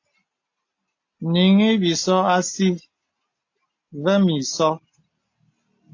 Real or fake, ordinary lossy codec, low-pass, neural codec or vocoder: real; AAC, 48 kbps; 7.2 kHz; none